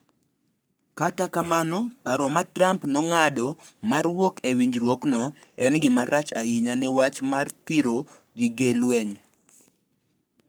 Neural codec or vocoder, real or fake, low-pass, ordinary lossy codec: codec, 44.1 kHz, 3.4 kbps, Pupu-Codec; fake; none; none